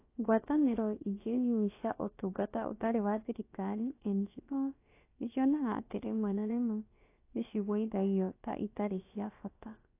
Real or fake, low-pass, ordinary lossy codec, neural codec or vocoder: fake; 3.6 kHz; AAC, 24 kbps; codec, 16 kHz, about 1 kbps, DyCAST, with the encoder's durations